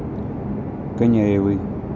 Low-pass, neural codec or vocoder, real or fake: 7.2 kHz; none; real